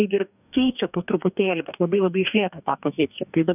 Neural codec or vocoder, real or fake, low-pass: codec, 44.1 kHz, 2.6 kbps, DAC; fake; 3.6 kHz